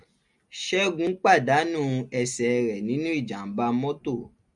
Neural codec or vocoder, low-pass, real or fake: none; 10.8 kHz; real